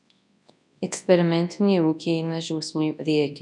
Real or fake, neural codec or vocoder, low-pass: fake; codec, 24 kHz, 0.9 kbps, WavTokenizer, large speech release; 10.8 kHz